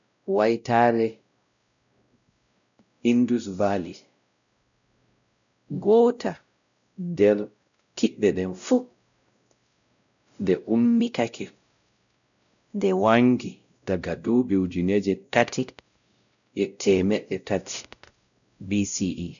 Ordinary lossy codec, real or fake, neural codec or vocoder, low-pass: none; fake; codec, 16 kHz, 0.5 kbps, X-Codec, WavLM features, trained on Multilingual LibriSpeech; 7.2 kHz